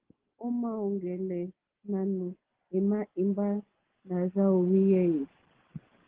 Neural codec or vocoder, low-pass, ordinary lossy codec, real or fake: none; 3.6 kHz; Opus, 16 kbps; real